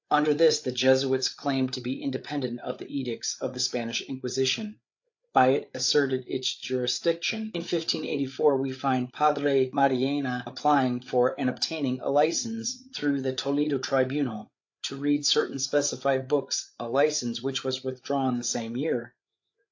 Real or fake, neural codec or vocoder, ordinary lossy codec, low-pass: fake; codec, 16 kHz, 8 kbps, FreqCodec, larger model; AAC, 48 kbps; 7.2 kHz